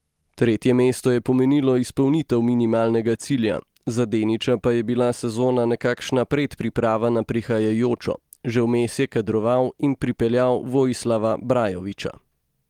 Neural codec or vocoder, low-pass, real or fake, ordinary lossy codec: none; 19.8 kHz; real; Opus, 24 kbps